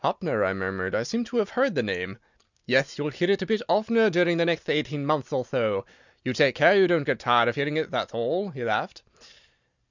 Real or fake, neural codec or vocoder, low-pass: real; none; 7.2 kHz